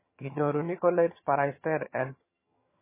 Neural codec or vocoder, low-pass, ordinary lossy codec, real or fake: vocoder, 22.05 kHz, 80 mel bands, HiFi-GAN; 3.6 kHz; MP3, 16 kbps; fake